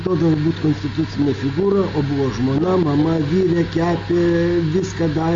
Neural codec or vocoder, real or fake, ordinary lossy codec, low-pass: none; real; Opus, 32 kbps; 7.2 kHz